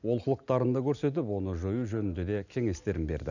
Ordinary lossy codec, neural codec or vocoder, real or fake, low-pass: none; none; real; 7.2 kHz